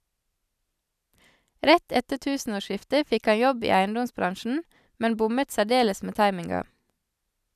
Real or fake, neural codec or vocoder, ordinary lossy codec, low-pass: real; none; none; 14.4 kHz